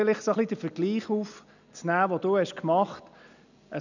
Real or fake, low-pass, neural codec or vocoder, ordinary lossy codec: real; 7.2 kHz; none; none